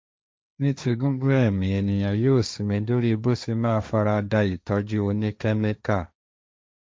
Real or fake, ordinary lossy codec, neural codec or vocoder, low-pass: fake; none; codec, 16 kHz, 1.1 kbps, Voila-Tokenizer; none